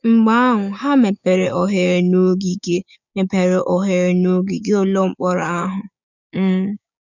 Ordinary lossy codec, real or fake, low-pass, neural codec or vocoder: none; fake; 7.2 kHz; codec, 16 kHz, 6 kbps, DAC